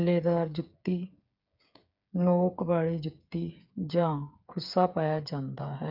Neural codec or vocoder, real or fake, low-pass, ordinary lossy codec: codec, 16 kHz, 8 kbps, FreqCodec, smaller model; fake; 5.4 kHz; none